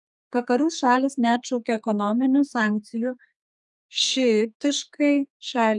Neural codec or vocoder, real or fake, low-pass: codec, 32 kHz, 1.9 kbps, SNAC; fake; 10.8 kHz